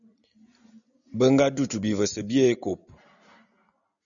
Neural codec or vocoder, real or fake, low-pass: none; real; 7.2 kHz